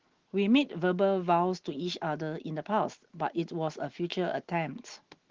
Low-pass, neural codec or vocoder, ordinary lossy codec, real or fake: 7.2 kHz; none; Opus, 16 kbps; real